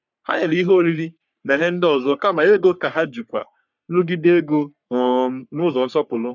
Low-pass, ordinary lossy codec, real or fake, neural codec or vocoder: 7.2 kHz; none; fake; codec, 44.1 kHz, 3.4 kbps, Pupu-Codec